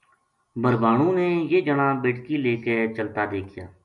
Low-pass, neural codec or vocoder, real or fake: 10.8 kHz; none; real